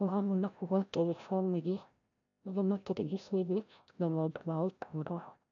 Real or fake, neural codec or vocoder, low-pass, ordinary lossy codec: fake; codec, 16 kHz, 0.5 kbps, FreqCodec, larger model; 7.2 kHz; none